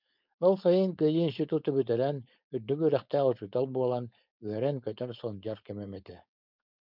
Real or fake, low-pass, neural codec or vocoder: fake; 5.4 kHz; codec, 16 kHz, 4.8 kbps, FACodec